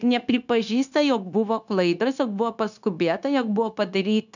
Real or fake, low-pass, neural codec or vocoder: fake; 7.2 kHz; codec, 16 kHz, 0.9 kbps, LongCat-Audio-Codec